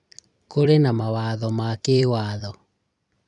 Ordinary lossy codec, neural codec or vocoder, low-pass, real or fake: none; vocoder, 44.1 kHz, 128 mel bands every 256 samples, BigVGAN v2; 10.8 kHz; fake